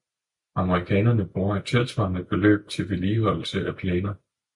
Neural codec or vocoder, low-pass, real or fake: none; 10.8 kHz; real